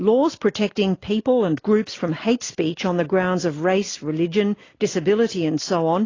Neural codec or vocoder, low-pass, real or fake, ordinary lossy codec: none; 7.2 kHz; real; AAC, 32 kbps